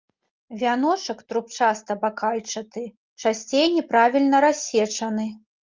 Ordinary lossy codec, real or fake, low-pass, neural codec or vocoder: Opus, 32 kbps; real; 7.2 kHz; none